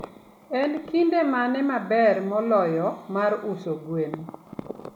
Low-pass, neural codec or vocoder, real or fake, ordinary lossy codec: 19.8 kHz; none; real; none